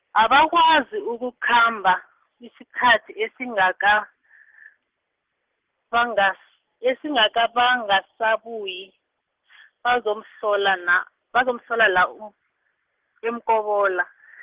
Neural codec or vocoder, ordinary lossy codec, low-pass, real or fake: none; Opus, 16 kbps; 3.6 kHz; real